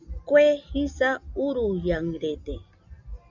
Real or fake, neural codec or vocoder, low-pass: real; none; 7.2 kHz